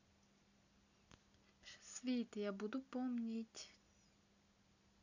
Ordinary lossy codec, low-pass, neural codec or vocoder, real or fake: none; 7.2 kHz; none; real